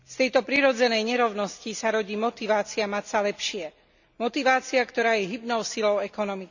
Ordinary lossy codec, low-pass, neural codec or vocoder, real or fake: none; 7.2 kHz; none; real